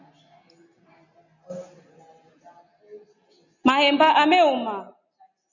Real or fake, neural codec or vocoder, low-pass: real; none; 7.2 kHz